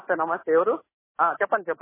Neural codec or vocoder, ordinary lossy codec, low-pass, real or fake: none; MP3, 16 kbps; 3.6 kHz; real